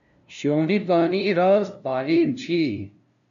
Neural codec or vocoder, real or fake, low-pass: codec, 16 kHz, 0.5 kbps, FunCodec, trained on LibriTTS, 25 frames a second; fake; 7.2 kHz